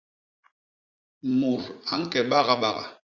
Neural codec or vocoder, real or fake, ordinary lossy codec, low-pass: vocoder, 44.1 kHz, 80 mel bands, Vocos; fake; Opus, 64 kbps; 7.2 kHz